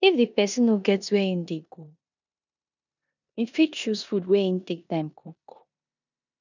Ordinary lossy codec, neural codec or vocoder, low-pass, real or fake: none; codec, 16 kHz in and 24 kHz out, 0.9 kbps, LongCat-Audio-Codec, four codebook decoder; 7.2 kHz; fake